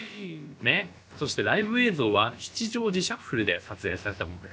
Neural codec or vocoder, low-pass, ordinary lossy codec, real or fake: codec, 16 kHz, about 1 kbps, DyCAST, with the encoder's durations; none; none; fake